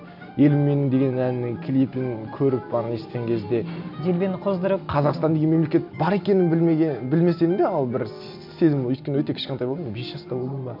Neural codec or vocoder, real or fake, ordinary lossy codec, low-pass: none; real; none; 5.4 kHz